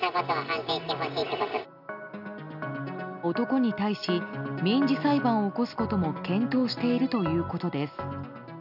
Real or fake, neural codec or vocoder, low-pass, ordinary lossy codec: real; none; 5.4 kHz; none